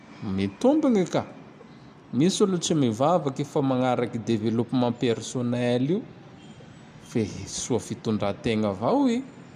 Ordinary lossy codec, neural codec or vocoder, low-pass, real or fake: none; none; 14.4 kHz; real